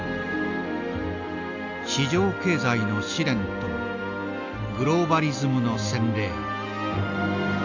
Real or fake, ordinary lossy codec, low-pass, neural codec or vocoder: real; none; 7.2 kHz; none